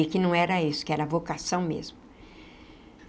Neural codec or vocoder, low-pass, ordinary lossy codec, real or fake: none; none; none; real